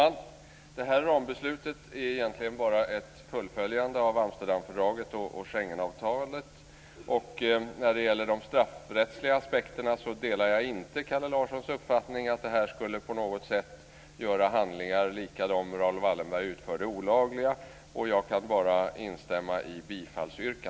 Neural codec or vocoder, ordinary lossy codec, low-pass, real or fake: none; none; none; real